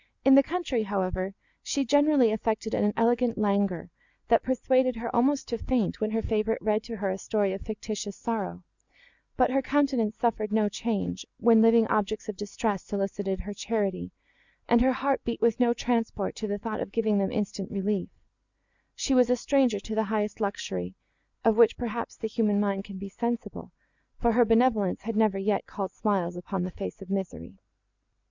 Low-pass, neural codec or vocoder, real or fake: 7.2 kHz; none; real